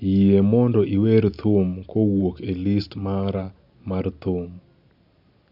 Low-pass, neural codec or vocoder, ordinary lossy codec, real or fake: 5.4 kHz; none; none; real